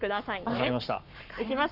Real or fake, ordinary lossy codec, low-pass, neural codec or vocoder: fake; none; 5.4 kHz; codec, 44.1 kHz, 7.8 kbps, Pupu-Codec